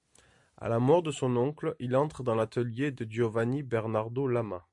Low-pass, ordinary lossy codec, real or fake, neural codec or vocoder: 10.8 kHz; MP3, 64 kbps; real; none